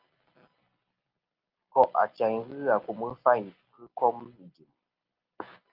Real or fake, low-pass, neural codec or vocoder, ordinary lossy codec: real; 5.4 kHz; none; Opus, 32 kbps